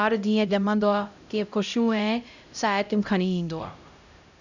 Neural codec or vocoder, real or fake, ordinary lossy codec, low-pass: codec, 16 kHz, 0.5 kbps, X-Codec, HuBERT features, trained on LibriSpeech; fake; none; 7.2 kHz